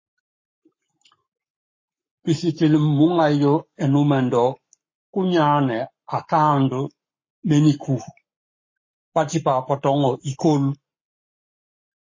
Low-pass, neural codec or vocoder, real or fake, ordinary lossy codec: 7.2 kHz; codec, 44.1 kHz, 7.8 kbps, Pupu-Codec; fake; MP3, 32 kbps